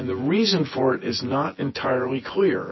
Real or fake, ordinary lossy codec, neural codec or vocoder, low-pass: fake; MP3, 24 kbps; vocoder, 24 kHz, 100 mel bands, Vocos; 7.2 kHz